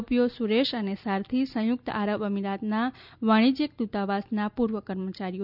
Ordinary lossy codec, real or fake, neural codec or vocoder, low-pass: none; real; none; 5.4 kHz